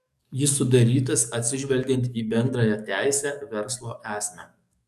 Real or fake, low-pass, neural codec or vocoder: fake; 14.4 kHz; codec, 44.1 kHz, 7.8 kbps, DAC